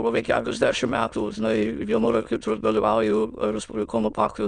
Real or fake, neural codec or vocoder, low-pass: fake; autoencoder, 22.05 kHz, a latent of 192 numbers a frame, VITS, trained on many speakers; 9.9 kHz